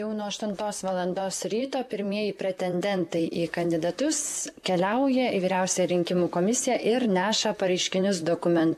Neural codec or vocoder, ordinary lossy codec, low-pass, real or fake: vocoder, 44.1 kHz, 128 mel bands, Pupu-Vocoder; MP3, 96 kbps; 14.4 kHz; fake